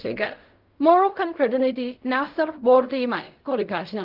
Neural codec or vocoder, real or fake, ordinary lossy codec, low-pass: codec, 16 kHz in and 24 kHz out, 0.4 kbps, LongCat-Audio-Codec, fine tuned four codebook decoder; fake; Opus, 24 kbps; 5.4 kHz